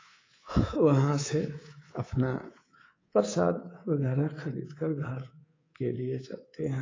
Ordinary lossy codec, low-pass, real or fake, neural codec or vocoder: AAC, 32 kbps; 7.2 kHz; fake; codec, 24 kHz, 3.1 kbps, DualCodec